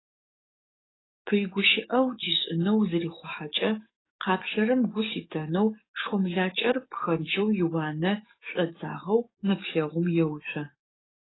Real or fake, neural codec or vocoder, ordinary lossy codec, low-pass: fake; codec, 44.1 kHz, 7.8 kbps, DAC; AAC, 16 kbps; 7.2 kHz